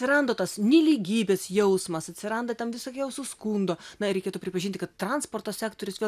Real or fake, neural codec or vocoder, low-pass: real; none; 14.4 kHz